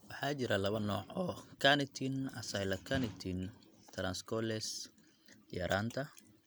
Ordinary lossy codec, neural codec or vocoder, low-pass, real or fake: none; none; none; real